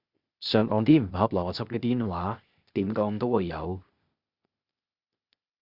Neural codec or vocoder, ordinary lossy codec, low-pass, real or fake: codec, 16 kHz, 0.8 kbps, ZipCodec; Opus, 64 kbps; 5.4 kHz; fake